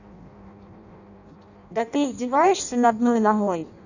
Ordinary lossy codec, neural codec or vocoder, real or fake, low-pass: none; codec, 16 kHz in and 24 kHz out, 0.6 kbps, FireRedTTS-2 codec; fake; 7.2 kHz